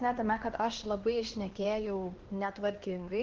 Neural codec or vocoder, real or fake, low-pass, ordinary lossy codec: codec, 16 kHz, 2 kbps, X-Codec, HuBERT features, trained on LibriSpeech; fake; 7.2 kHz; Opus, 16 kbps